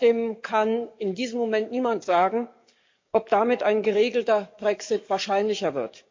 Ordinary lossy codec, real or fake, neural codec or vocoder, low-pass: MP3, 48 kbps; fake; codec, 44.1 kHz, 7.8 kbps, DAC; 7.2 kHz